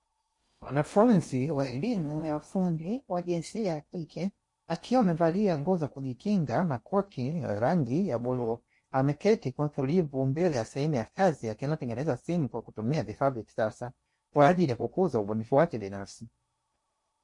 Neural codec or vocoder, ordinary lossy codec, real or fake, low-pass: codec, 16 kHz in and 24 kHz out, 0.8 kbps, FocalCodec, streaming, 65536 codes; MP3, 48 kbps; fake; 10.8 kHz